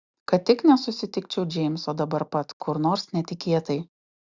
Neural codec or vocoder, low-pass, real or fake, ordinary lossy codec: none; 7.2 kHz; real; Opus, 64 kbps